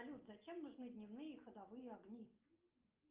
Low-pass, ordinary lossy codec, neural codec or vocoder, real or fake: 3.6 kHz; Opus, 32 kbps; none; real